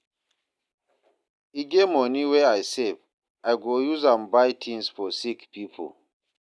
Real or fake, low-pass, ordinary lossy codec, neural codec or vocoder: real; none; none; none